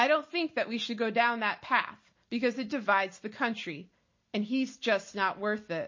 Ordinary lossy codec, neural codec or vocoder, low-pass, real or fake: MP3, 32 kbps; none; 7.2 kHz; real